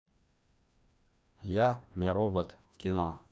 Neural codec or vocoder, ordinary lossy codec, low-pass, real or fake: codec, 16 kHz, 1 kbps, FreqCodec, larger model; none; none; fake